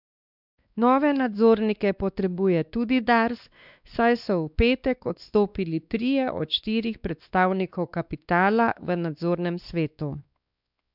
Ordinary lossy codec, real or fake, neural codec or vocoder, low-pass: none; fake; codec, 16 kHz, 2 kbps, X-Codec, WavLM features, trained on Multilingual LibriSpeech; 5.4 kHz